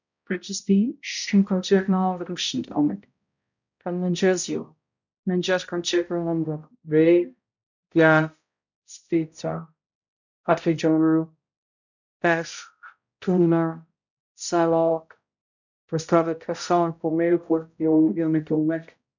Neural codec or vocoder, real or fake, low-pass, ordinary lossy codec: codec, 16 kHz, 0.5 kbps, X-Codec, HuBERT features, trained on balanced general audio; fake; 7.2 kHz; none